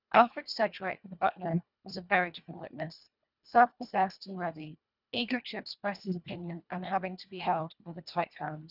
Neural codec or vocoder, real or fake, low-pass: codec, 24 kHz, 1.5 kbps, HILCodec; fake; 5.4 kHz